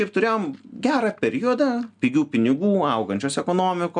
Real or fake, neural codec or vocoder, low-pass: real; none; 9.9 kHz